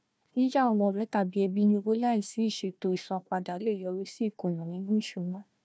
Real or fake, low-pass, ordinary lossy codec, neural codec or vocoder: fake; none; none; codec, 16 kHz, 1 kbps, FunCodec, trained on Chinese and English, 50 frames a second